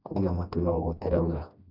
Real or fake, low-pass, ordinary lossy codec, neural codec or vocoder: fake; 5.4 kHz; none; codec, 16 kHz, 1 kbps, FreqCodec, smaller model